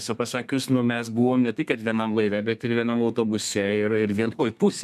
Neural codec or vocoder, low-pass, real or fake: codec, 32 kHz, 1.9 kbps, SNAC; 14.4 kHz; fake